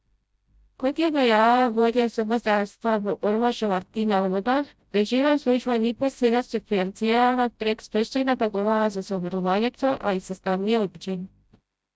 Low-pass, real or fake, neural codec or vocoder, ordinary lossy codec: none; fake; codec, 16 kHz, 0.5 kbps, FreqCodec, smaller model; none